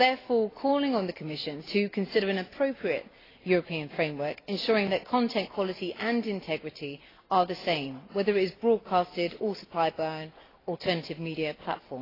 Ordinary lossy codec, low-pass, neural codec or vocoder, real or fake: AAC, 24 kbps; 5.4 kHz; none; real